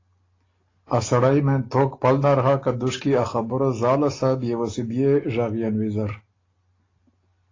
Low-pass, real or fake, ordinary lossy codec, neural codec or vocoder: 7.2 kHz; real; AAC, 32 kbps; none